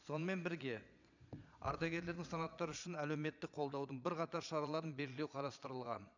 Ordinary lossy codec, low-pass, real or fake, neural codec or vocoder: none; 7.2 kHz; fake; vocoder, 22.05 kHz, 80 mel bands, Vocos